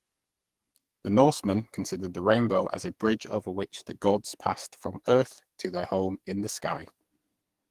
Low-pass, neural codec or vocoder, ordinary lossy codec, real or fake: 14.4 kHz; codec, 44.1 kHz, 2.6 kbps, SNAC; Opus, 24 kbps; fake